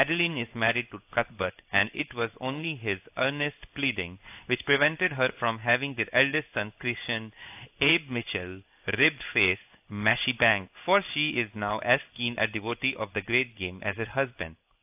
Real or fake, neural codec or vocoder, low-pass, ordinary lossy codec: fake; codec, 16 kHz in and 24 kHz out, 1 kbps, XY-Tokenizer; 3.6 kHz; AAC, 32 kbps